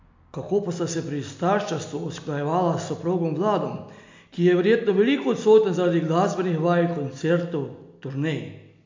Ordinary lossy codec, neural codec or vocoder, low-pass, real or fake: MP3, 64 kbps; none; 7.2 kHz; real